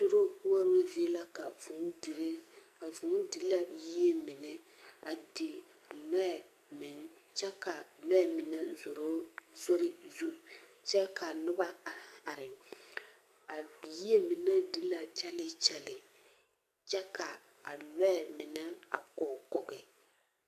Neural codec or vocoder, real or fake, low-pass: codec, 44.1 kHz, 2.6 kbps, SNAC; fake; 14.4 kHz